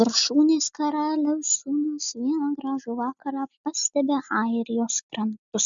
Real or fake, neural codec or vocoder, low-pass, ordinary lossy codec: real; none; 7.2 kHz; MP3, 96 kbps